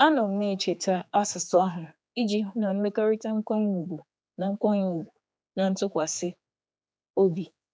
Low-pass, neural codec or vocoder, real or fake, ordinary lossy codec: none; codec, 16 kHz, 4 kbps, X-Codec, HuBERT features, trained on general audio; fake; none